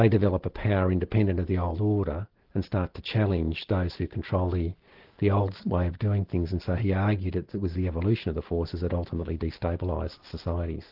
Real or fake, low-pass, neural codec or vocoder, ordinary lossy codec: real; 5.4 kHz; none; Opus, 16 kbps